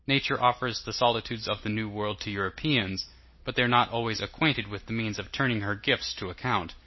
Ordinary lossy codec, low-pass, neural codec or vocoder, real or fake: MP3, 24 kbps; 7.2 kHz; none; real